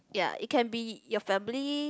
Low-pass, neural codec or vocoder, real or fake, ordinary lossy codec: none; none; real; none